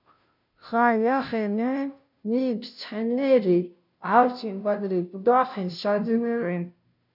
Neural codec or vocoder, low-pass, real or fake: codec, 16 kHz, 0.5 kbps, FunCodec, trained on Chinese and English, 25 frames a second; 5.4 kHz; fake